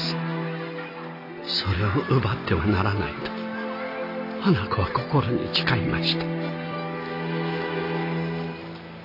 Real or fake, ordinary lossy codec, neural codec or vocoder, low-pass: real; AAC, 32 kbps; none; 5.4 kHz